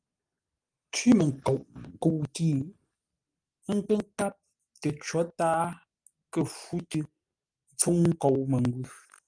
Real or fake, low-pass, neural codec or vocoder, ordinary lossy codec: real; 9.9 kHz; none; Opus, 32 kbps